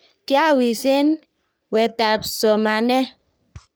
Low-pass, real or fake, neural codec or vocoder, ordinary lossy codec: none; fake; codec, 44.1 kHz, 3.4 kbps, Pupu-Codec; none